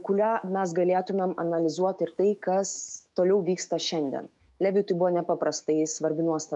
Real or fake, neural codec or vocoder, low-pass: fake; autoencoder, 48 kHz, 128 numbers a frame, DAC-VAE, trained on Japanese speech; 10.8 kHz